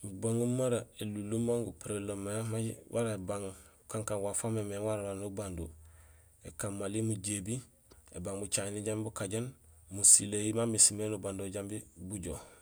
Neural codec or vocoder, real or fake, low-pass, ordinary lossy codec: none; real; none; none